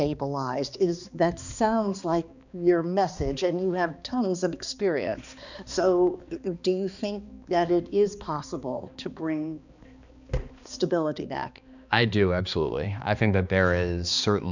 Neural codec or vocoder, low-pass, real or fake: codec, 16 kHz, 2 kbps, X-Codec, HuBERT features, trained on balanced general audio; 7.2 kHz; fake